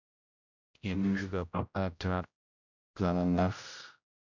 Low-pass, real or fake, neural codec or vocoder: 7.2 kHz; fake; codec, 16 kHz, 0.5 kbps, X-Codec, HuBERT features, trained on general audio